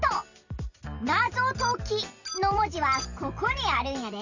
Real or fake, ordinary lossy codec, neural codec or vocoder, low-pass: real; none; none; 7.2 kHz